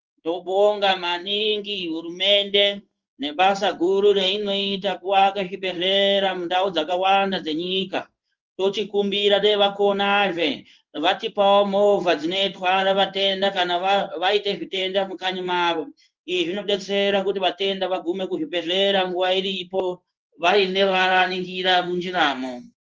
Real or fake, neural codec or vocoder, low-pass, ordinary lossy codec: fake; codec, 16 kHz in and 24 kHz out, 1 kbps, XY-Tokenizer; 7.2 kHz; Opus, 24 kbps